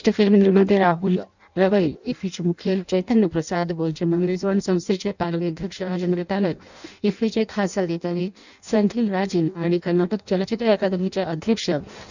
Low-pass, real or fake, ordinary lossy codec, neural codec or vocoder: 7.2 kHz; fake; none; codec, 16 kHz in and 24 kHz out, 0.6 kbps, FireRedTTS-2 codec